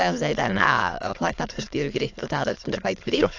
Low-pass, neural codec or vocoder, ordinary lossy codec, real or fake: 7.2 kHz; autoencoder, 22.05 kHz, a latent of 192 numbers a frame, VITS, trained on many speakers; AAC, 48 kbps; fake